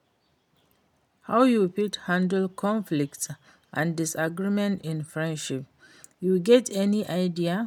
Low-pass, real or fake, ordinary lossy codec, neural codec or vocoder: 19.8 kHz; real; none; none